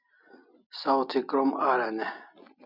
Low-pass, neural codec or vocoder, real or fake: 5.4 kHz; none; real